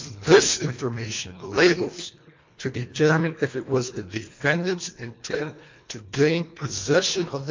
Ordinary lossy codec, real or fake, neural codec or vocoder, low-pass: MP3, 48 kbps; fake; codec, 24 kHz, 1.5 kbps, HILCodec; 7.2 kHz